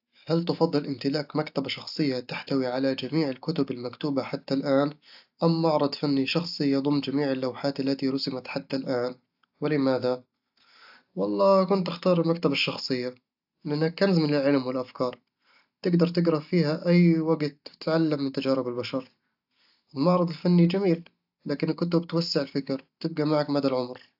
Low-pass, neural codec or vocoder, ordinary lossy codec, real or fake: 5.4 kHz; none; AAC, 48 kbps; real